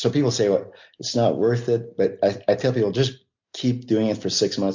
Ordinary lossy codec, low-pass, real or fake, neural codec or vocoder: MP3, 64 kbps; 7.2 kHz; real; none